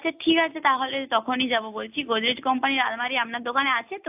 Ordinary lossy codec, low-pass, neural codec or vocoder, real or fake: AAC, 32 kbps; 3.6 kHz; none; real